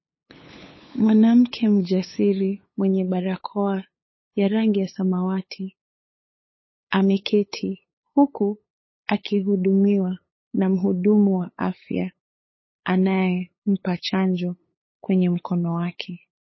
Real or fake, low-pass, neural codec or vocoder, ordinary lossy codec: fake; 7.2 kHz; codec, 16 kHz, 8 kbps, FunCodec, trained on LibriTTS, 25 frames a second; MP3, 24 kbps